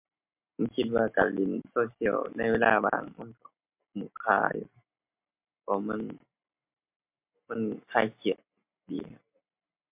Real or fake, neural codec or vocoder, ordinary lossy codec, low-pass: real; none; MP3, 32 kbps; 3.6 kHz